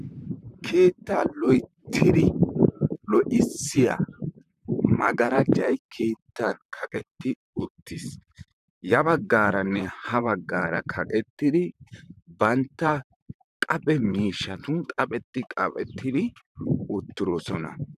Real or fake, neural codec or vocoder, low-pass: fake; vocoder, 44.1 kHz, 128 mel bands, Pupu-Vocoder; 14.4 kHz